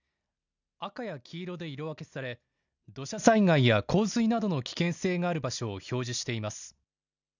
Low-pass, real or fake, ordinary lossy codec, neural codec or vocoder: 7.2 kHz; real; none; none